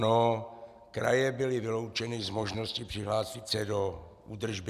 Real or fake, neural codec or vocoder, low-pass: real; none; 14.4 kHz